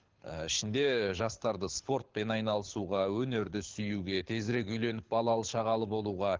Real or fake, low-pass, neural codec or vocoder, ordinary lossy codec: fake; 7.2 kHz; codec, 24 kHz, 6 kbps, HILCodec; Opus, 16 kbps